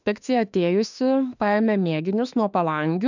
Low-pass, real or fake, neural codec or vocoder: 7.2 kHz; fake; autoencoder, 48 kHz, 32 numbers a frame, DAC-VAE, trained on Japanese speech